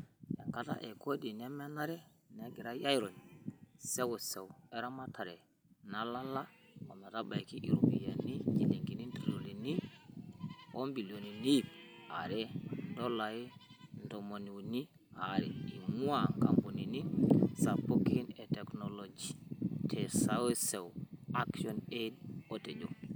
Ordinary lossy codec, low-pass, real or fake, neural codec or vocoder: none; none; real; none